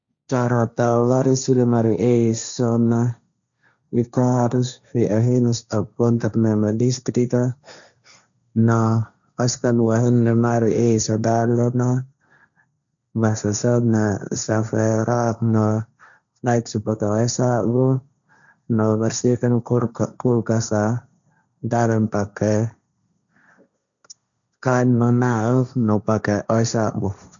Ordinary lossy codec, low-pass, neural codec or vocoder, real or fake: none; 7.2 kHz; codec, 16 kHz, 1.1 kbps, Voila-Tokenizer; fake